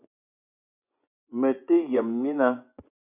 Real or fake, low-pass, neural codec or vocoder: fake; 3.6 kHz; vocoder, 24 kHz, 100 mel bands, Vocos